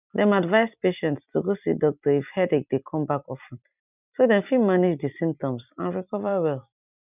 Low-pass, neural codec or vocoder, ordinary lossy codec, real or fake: 3.6 kHz; vocoder, 44.1 kHz, 128 mel bands every 256 samples, BigVGAN v2; none; fake